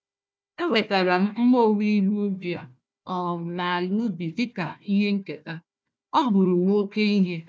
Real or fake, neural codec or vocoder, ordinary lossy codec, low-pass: fake; codec, 16 kHz, 1 kbps, FunCodec, trained on Chinese and English, 50 frames a second; none; none